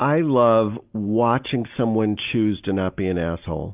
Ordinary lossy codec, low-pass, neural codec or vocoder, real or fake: Opus, 24 kbps; 3.6 kHz; none; real